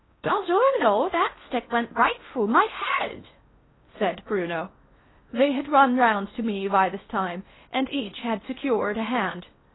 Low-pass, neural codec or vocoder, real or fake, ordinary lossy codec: 7.2 kHz; codec, 16 kHz in and 24 kHz out, 0.6 kbps, FocalCodec, streaming, 4096 codes; fake; AAC, 16 kbps